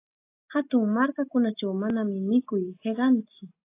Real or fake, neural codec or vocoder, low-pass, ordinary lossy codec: real; none; 3.6 kHz; AAC, 24 kbps